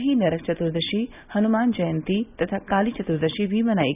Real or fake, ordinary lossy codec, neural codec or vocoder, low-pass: real; none; none; 3.6 kHz